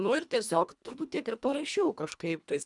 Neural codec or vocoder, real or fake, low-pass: codec, 24 kHz, 1.5 kbps, HILCodec; fake; 10.8 kHz